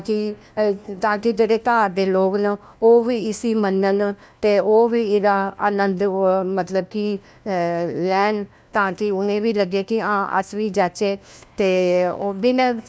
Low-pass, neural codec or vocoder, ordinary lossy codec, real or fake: none; codec, 16 kHz, 1 kbps, FunCodec, trained on LibriTTS, 50 frames a second; none; fake